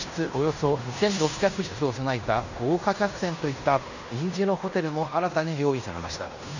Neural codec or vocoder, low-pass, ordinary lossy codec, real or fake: codec, 16 kHz in and 24 kHz out, 0.9 kbps, LongCat-Audio-Codec, fine tuned four codebook decoder; 7.2 kHz; none; fake